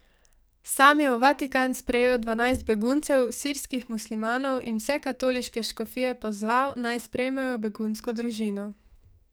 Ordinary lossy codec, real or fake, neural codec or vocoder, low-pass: none; fake; codec, 44.1 kHz, 2.6 kbps, SNAC; none